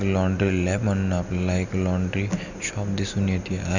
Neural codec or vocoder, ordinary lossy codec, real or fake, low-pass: none; none; real; 7.2 kHz